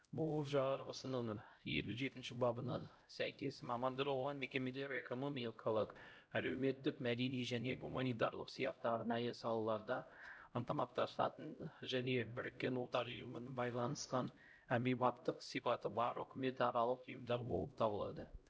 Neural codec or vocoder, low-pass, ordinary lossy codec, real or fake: codec, 16 kHz, 0.5 kbps, X-Codec, HuBERT features, trained on LibriSpeech; none; none; fake